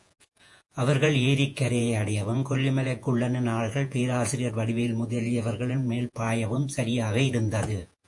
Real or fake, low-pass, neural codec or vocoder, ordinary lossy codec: fake; 10.8 kHz; vocoder, 48 kHz, 128 mel bands, Vocos; AAC, 64 kbps